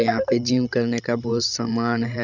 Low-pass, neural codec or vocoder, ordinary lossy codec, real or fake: 7.2 kHz; codec, 16 kHz, 16 kbps, FreqCodec, larger model; none; fake